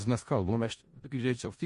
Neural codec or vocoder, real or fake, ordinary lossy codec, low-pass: codec, 16 kHz in and 24 kHz out, 0.4 kbps, LongCat-Audio-Codec, four codebook decoder; fake; MP3, 48 kbps; 10.8 kHz